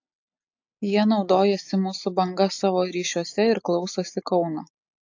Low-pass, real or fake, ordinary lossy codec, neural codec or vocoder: 7.2 kHz; real; AAC, 48 kbps; none